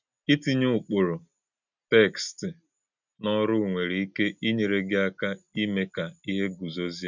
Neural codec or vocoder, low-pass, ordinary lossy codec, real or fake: none; 7.2 kHz; none; real